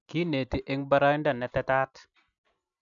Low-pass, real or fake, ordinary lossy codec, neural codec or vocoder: 7.2 kHz; real; none; none